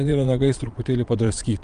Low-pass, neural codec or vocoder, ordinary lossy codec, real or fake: 9.9 kHz; vocoder, 22.05 kHz, 80 mel bands, Vocos; Opus, 32 kbps; fake